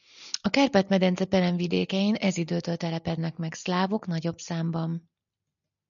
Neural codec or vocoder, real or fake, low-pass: none; real; 7.2 kHz